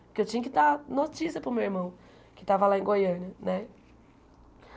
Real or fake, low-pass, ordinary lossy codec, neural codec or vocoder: real; none; none; none